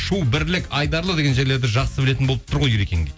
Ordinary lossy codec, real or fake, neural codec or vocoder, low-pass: none; real; none; none